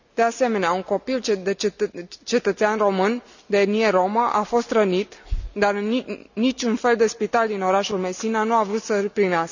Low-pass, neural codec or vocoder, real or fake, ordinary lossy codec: 7.2 kHz; none; real; none